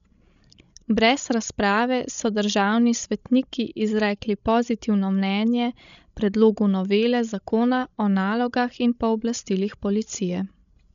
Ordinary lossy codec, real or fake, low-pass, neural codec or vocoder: none; fake; 7.2 kHz; codec, 16 kHz, 16 kbps, FreqCodec, larger model